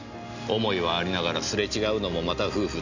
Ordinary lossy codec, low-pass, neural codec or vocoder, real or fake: none; 7.2 kHz; none; real